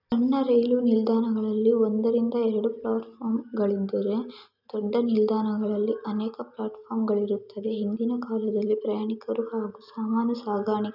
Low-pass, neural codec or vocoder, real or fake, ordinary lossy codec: 5.4 kHz; none; real; none